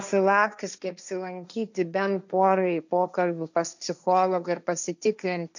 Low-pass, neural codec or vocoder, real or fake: 7.2 kHz; codec, 16 kHz, 1.1 kbps, Voila-Tokenizer; fake